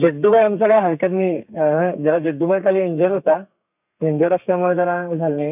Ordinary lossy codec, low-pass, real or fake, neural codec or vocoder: none; 3.6 kHz; fake; codec, 32 kHz, 1.9 kbps, SNAC